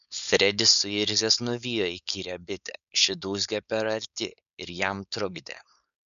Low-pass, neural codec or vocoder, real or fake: 7.2 kHz; codec, 16 kHz, 4.8 kbps, FACodec; fake